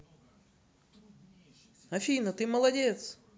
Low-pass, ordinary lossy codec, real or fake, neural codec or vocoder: none; none; real; none